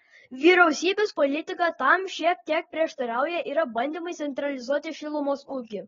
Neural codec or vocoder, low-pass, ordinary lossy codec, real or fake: none; 7.2 kHz; AAC, 24 kbps; real